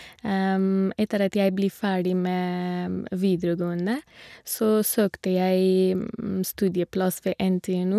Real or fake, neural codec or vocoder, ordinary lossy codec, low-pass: real; none; none; 14.4 kHz